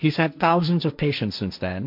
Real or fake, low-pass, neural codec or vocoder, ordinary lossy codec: fake; 5.4 kHz; codec, 16 kHz, 1.1 kbps, Voila-Tokenizer; MP3, 48 kbps